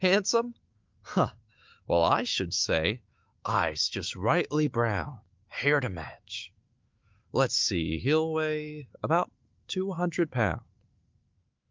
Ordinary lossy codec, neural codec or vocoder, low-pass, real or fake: Opus, 32 kbps; autoencoder, 48 kHz, 128 numbers a frame, DAC-VAE, trained on Japanese speech; 7.2 kHz; fake